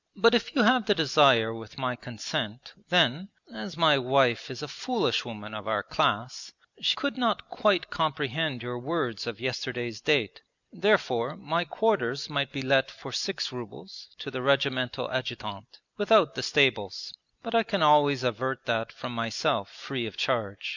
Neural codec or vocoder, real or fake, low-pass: none; real; 7.2 kHz